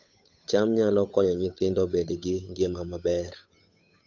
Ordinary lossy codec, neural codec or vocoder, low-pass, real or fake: AAC, 48 kbps; codec, 16 kHz, 8 kbps, FunCodec, trained on Chinese and English, 25 frames a second; 7.2 kHz; fake